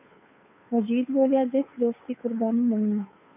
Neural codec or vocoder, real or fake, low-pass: codec, 16 kHz, 2 kbps, FunCodec, trained on Chinese and English, 25 frames a second; fake; 3.6 kHz